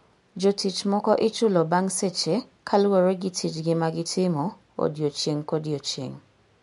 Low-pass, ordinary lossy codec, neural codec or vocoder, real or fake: 10.8 kHz; MP3, 64 kbps; none; real